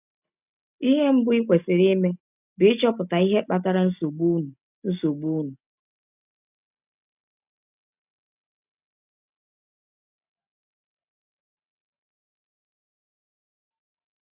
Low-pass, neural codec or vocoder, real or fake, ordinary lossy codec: 3.6 kHz; none; real; none